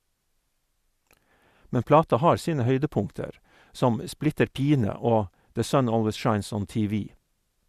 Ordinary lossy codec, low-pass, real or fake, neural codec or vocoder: Opus, 64 kbps; 14.4 kHz; real; none